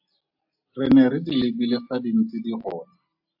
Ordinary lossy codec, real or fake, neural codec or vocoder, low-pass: AAC, 48 kbps; real; none; 5.4 kHz